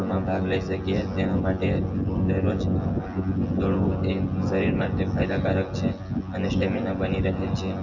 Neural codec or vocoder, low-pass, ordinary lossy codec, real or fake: vocoder, 24 kHz, 100 mel bands, Vocos; 7.2 kHz; Opus, 32 kbps; fake